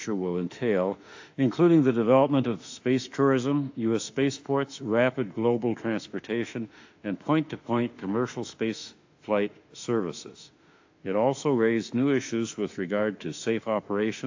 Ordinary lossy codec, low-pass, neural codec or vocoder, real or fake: AAC, 48 kbps; 7.2 kHz; autoencoder, 48 kHz, 32 numbers a frame, DAC-VAE, trained on Japanese speech; fake